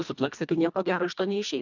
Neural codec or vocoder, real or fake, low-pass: codec, 24 kHz, 1.5 kbps, HILCodec; fake; 7.2 kHz